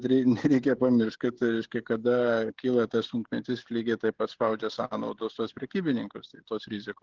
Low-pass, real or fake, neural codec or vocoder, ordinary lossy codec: 7.2 kHz; fake; codec, 16 kHz, 16 kbps, FreqCodec, smaller model; Opus, 16 kbps